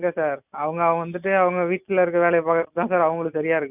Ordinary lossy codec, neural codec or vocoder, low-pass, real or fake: none; none; 3.6 kHz; real